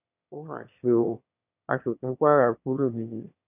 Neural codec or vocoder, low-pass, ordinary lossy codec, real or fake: autoencoder, 22.05 kHz, a latent of 192 numbers a frame, VITS, trained on one speaker; 3.6 kHz; none; fake